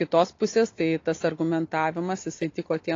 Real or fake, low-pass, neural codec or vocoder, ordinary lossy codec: real; 7.2 kHz; none; AAC, 32 kbps